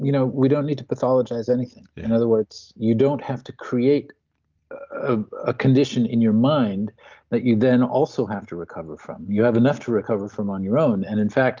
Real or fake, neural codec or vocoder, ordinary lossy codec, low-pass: real; none; Opus, 32 kbps; 7.2 kHz